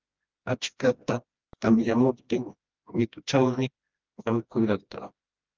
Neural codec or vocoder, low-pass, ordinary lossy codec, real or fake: codec, 16 kHz, 1 kbps, FreqCodec, smaller model; 7.2 kHz; Opus, 24 kbps; fake